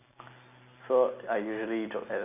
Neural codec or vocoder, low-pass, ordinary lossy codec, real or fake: none; 3.6 kHz; none; real